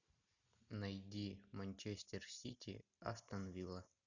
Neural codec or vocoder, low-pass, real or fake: none; 7.2 kHz; real